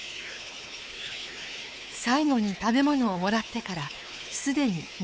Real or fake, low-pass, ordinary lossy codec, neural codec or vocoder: fake; none; none; codec, 16 kHz, 4 kbps, X-Codec, WavLM features, trained on Multilingual LibriSpeech